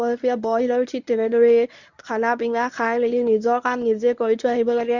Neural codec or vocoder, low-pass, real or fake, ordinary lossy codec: codec, 24 kHz, 0.9 kbps, WavTokenizer, medium speech release version 1; 7.2 kHz; fake; none